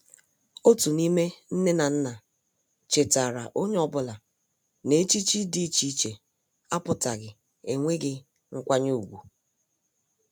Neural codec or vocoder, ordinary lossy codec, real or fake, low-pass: none; none; real; none